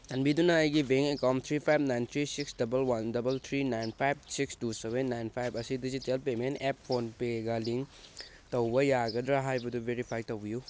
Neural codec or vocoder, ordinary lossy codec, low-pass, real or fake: none; none; none; real